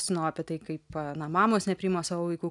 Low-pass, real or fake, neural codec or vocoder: 10.8 kHz; real; none